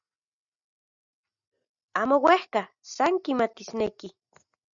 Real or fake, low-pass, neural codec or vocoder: real; 7.2 kHz; none